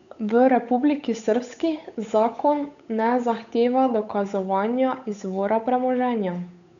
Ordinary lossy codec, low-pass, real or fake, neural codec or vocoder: none; 7.2 kHz; fake; codec, 16 kHz, 8 kbps, FunCodec, trained on Chinese and English, 25 frames a second